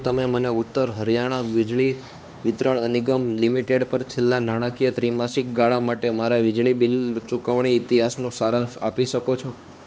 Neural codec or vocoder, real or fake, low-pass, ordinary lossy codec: codec, 16 kHz, 2 kbps, X-Codec, HuBERT features, trained on LibriSpeech; fake; none; none